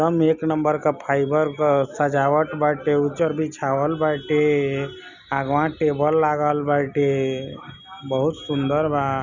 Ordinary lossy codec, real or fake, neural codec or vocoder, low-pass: none; real; none; 7.2 kHz